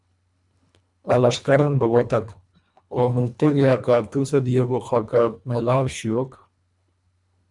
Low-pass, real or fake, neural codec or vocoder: 10.8 kHz; fake; codec, 24 kHz, 1.5 kbps, HILCodec